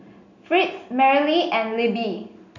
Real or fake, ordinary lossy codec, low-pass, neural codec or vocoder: real; none; 7.2 kHz; none